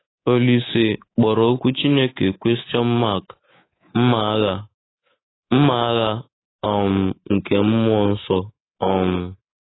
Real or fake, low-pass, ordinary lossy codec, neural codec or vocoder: fake; 7.2 kHz; AAC, 16 kbps; codec, 24 kHz, 3.1 kbps, DualCodec